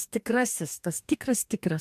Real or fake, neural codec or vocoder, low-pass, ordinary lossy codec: fake; codec, 44.1 kHz, 2.6 kbps, DAC; 14.4 kHz; AAC, 96 kbps